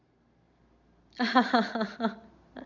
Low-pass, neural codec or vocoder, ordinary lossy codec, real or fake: 7.2 kHz; none; none; real